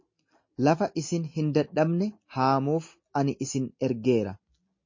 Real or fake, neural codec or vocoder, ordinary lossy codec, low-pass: real; none; MP3, 32 kbps; 7.2 kHz